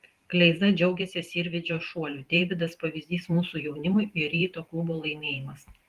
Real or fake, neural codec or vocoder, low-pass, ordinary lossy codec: fake; vocoder, 44.1 kHz, 128 mel bands every 512 samples, BigVGAN v2; 14.4 kHz; Opus, 24 kbps